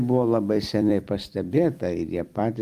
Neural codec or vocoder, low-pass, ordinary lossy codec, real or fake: vocoder, 44.1 kHz, 128 mel bands every 256 samples, BigVGAN v2; 14.4 kHz; Opus, 32 kbps; fake